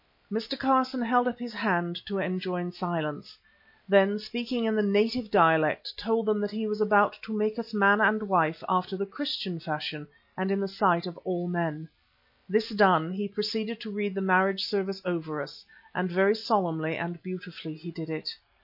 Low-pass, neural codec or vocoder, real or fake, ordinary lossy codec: 5.4 kHz; none; real; MP3, 32 kbps